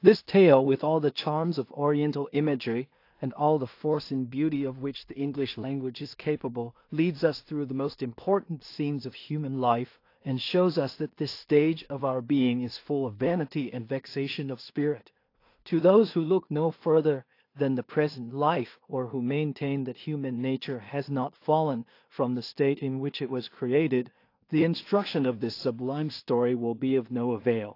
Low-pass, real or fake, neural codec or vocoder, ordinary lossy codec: 5.4 kHz; fake; codec, 16 kHz in and 24 kHz out, 0.4 kbps, LongCat-Audio-Codec, two codebook decoder; AAC, 32 kbps